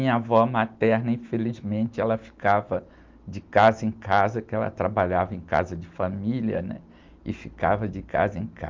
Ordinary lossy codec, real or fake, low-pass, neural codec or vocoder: Opus, 24 kbps; real; 7.2 kHz; none